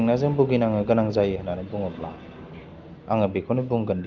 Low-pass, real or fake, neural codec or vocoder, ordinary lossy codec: 7.2 kHz; real; none; Opus, 32 kbps